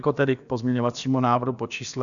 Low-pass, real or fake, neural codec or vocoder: 7.2 kHz; fake; codec, 16 kHz, about 1 kbps, DyCAST, with the encoder's durations